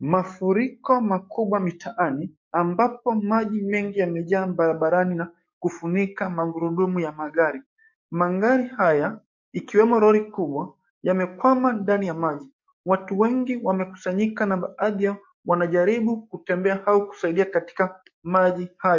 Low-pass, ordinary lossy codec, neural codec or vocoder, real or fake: 7.2 kHz; MP3, 48 kbps; codec, 44.1 kHz, 7.8 kbps, DAC; fake